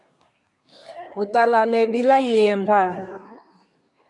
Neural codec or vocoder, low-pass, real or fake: codec, 24 kHz, 1 kbps, SNAC; 10.8 kHz; fake